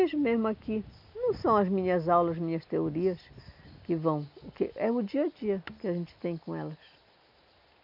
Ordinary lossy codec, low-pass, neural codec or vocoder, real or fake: MP3, 48 kbps; 5.4 kHz; none; real